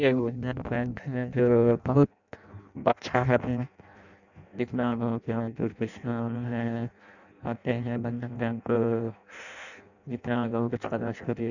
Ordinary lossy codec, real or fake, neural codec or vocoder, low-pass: none; fake; codec, 16 kHz in and 24 kHz out, 0.6 kbps, FireRedTTS-2 codec; 7.2 kHz